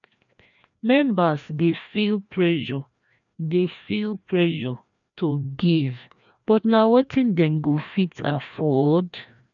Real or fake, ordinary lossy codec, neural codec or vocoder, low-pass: fake; none; codec, 16 kHz, 1 kbps, FreqCodec, larger model; 7.2 kHz